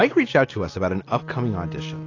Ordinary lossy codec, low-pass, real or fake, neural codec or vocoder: AAC, 32 kbps; 7.2 kHz; real; none